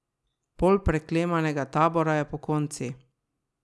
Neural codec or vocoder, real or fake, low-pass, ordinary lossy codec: none; real; none; none